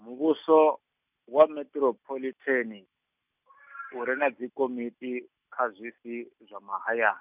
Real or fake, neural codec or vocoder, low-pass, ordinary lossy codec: real; none; 3.6 kHz; none